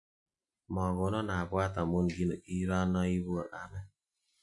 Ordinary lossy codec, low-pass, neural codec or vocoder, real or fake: none; 10.8 kHz; none; real